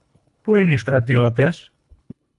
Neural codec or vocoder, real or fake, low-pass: codec, 24 kHz, 1.5 kbps, HILCodec; fake; 10.8 kHz